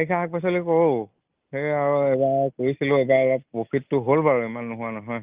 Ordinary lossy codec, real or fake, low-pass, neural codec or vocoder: Opus, 32 kbps; real; 3.6 kHz; none